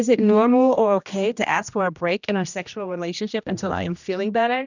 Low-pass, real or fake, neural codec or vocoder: 7.2 kHz; fake; codec, 16 kHz, 1 kbps, X-Codec, HuBERT features, trained on general audio